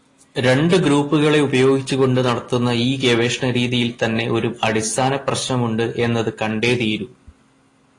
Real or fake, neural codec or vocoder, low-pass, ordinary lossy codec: real; none; 10.8 kHz; AAC, 32 kbps